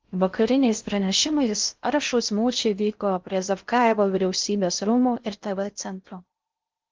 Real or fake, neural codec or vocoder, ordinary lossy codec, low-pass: fake; codec, 16 kHz in and 24 kHz out, 0.6 kbps, FocalCodec, streaming, 4096 codes; Opus, 32 kbps; 7.2 kHz